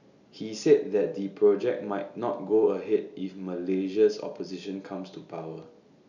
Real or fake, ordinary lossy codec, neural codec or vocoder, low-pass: real; none; none; 7.2 kHz